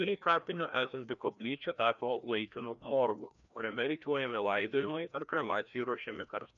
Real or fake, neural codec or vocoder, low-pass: fake; codec, 16 kHz, 1 kbps, FreqCodec, larger model; 7.2 kHz